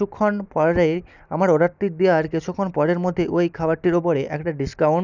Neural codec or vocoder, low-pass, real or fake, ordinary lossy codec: none; 7.2 kHz; real; none